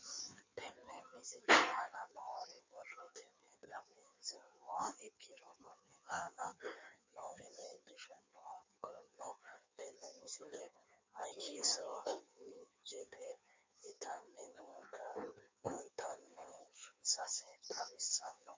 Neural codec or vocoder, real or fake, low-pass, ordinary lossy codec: codec, 16 kHz in and 24 kHz out, 1.1 kbps, FireRedTTS-2 codec; fake; 7.2 kHz; MP3, 64 kbps